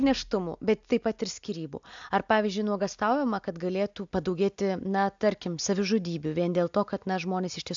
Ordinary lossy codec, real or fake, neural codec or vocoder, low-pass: AAC, 64 kbps; real; none; 7.2 kHz